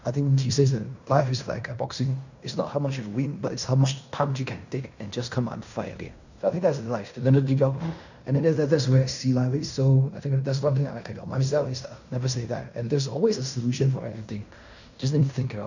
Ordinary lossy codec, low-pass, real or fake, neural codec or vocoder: none; 7.2 kHz; fake; codec, 16 kHz in and 24 kHz out, 0.9 kbps, LongCat-Audio-Codec, fine tuned four codebook decoder